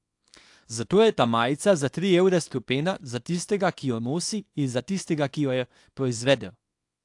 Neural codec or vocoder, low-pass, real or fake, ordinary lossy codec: codec, 24 kHz, 0.9 kbps, WavTokenizer, small release; 10.8 kHz; fake; AAC, 64 kbps